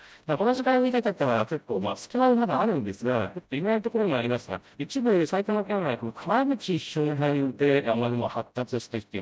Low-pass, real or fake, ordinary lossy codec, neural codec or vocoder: none; fake; none; codec, 16 kHz, 0.5 kbps, FreqCodec, smaller model